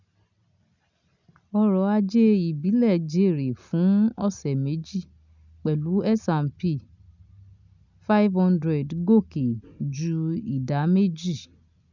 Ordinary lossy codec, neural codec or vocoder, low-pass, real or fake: none; none; 7.2 kHz; real